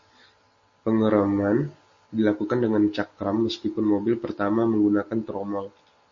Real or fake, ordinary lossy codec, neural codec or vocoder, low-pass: real; MP3, 32 kbps; none; 7.2 kHz